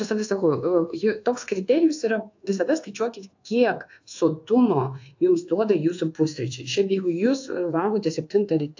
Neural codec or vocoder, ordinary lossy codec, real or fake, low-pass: codec, 24 kHz, 1.2 kbps, DualCodec; AAC, 48 kbps; fake; 7.2 kHz